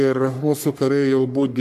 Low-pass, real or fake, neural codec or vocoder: 14.4 kHz; fake; codec, 44.1 kHz, 3.4 kbps, Pupu-Codec